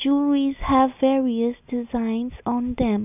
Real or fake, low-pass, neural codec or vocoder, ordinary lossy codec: real; 3.6 kHz; none; none